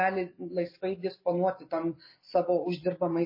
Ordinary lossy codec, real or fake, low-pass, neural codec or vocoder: MP3, 24 kbps; real; 5.4 kHz; none